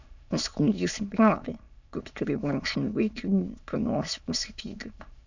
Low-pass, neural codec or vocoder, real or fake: 7.2 kHz; autoencoder, 22.05 kHz, a latent of 192 numbers a frame, VITS, trained on many speakers; fake